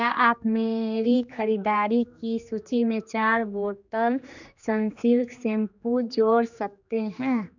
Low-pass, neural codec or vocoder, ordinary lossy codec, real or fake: 7.2 kHz; codec, 16 kHz, 2 kbps, X-Codec, HuBERT features, trained on general audio; none; fake